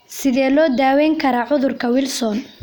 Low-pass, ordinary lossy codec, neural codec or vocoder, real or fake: none; none; none; real